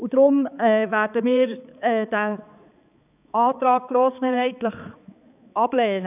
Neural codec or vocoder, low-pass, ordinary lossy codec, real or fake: codec, 16 kHz, 4 kbps, X-Codec, HuBERT features, trained on balanced general audio; 3.6 kHz; none; fake